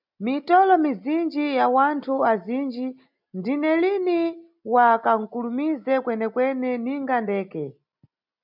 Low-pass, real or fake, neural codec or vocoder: 5.4 kHz; real; none